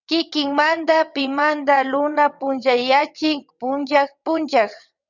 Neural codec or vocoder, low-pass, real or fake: vocoder, 22.05 kHz, 80 mel bands, WaveNeXt; 7.2 kHz; fake